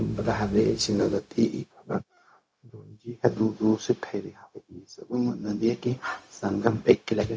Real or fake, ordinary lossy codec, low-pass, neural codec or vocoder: fake; none; none; codec, 16 kHz, 0.4 kbps, LongCat-Audio-Codec